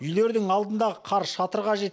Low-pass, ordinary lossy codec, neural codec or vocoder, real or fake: none; none; none; real